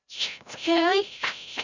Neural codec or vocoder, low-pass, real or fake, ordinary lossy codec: codec, 16 kHz, 0.5 kbps, FreqCodec, larger model; 7.2 kHz; fake; none